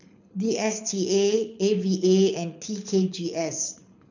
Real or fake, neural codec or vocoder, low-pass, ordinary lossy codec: fake; codec, 24 kHz, 6 kbps, HILCodec; 7.2 kHz; none